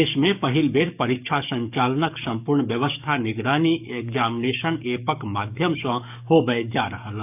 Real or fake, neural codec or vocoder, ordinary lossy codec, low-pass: fake; codec, 44.1 kHz, 7.8 kbps, DAC; none; 3.6 kHz